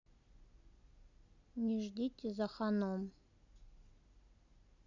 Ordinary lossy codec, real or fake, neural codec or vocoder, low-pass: none; real; none; 7.2 kHz